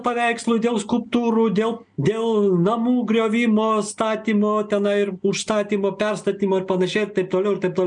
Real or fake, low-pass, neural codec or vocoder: real; 9.9 kHz; none